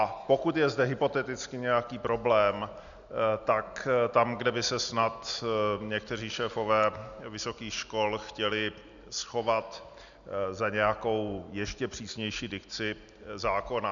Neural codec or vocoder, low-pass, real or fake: none; 7.2 kHz; real